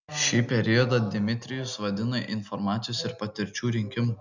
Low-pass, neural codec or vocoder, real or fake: 7.2 kHz; none; real